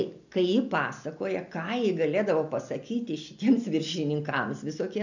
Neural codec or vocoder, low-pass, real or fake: none; 7.2 kHz; real